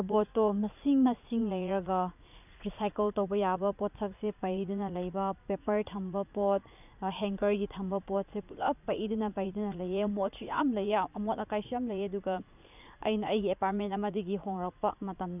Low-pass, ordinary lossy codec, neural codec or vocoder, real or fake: 3.6 kHz; none; vocoder, 44.1 kHz, 80 mel bands, Vocos; fake